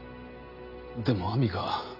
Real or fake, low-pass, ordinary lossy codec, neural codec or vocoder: real; 5.4 kHz; none; none